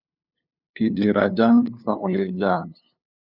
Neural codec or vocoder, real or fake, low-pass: codec, 16 kHz, 2 kbps, FunCodec, trained on LibriTTS, 25 frames a second; fake; 5.4 kHz